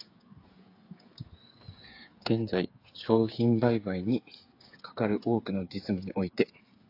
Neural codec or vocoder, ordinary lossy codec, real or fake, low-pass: codec, 16 kHz, 8 kbps, FreqCodec, smaller model; AAC, 32 kbps; fake; 5.4 kHz